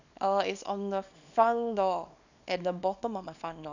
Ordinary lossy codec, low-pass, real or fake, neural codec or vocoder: none; 7.2 kHz; fake; codec, 24 kHz, 0.9 kbps, WavTokenizer, small release